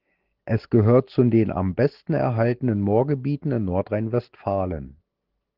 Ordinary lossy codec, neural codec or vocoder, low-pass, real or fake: Opus, 16 kbps; none; 5.4 kHz; real